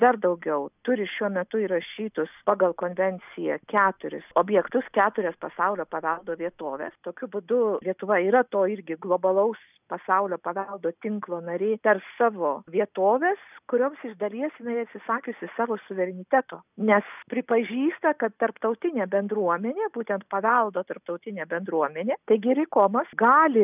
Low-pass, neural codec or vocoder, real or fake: 3.6 kHz; none; real